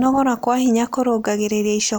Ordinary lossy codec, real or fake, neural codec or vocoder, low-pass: none; real; none; none